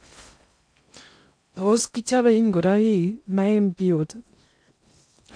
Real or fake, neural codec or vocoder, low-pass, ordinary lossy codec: fake; codec, 16 kHz in and 24 kHz out, 0.6 kbps, FocalCodec, streaming, 2048 codes; 9.9 kHz; none